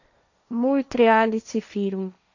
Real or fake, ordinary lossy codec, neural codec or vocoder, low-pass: fake; none; codec, 16 kHz, 1.1 kbps, Voila-Tokenizer; 7.2 kHz